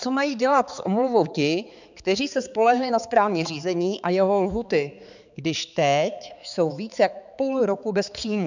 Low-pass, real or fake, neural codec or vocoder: 7.2 kHz; fake; codec, 16 kHz, 4 kbps, X-Codec, HuBERT features, trained on balanced general audio